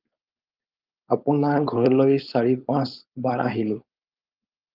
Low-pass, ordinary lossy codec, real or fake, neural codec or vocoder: 5.4 kHz; Opus, 32 kbps; fake; codec, 16 kHz, 4.8 kbps, FACodec